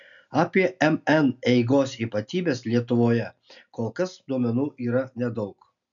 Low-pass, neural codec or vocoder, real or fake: 7.2 kHz; none; real